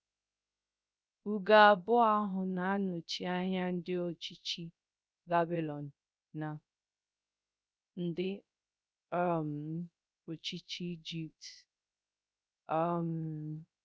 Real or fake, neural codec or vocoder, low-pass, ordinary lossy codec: fake; codec, 16 kHz, 0.3 kbps, FocalCodec; none; none